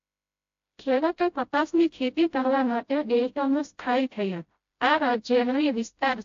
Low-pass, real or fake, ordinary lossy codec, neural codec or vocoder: 7.2 kHz; fake; AAC, 48 kbps; codec, 16 kHz, 0.5 kbps, FreqCodec, smaller model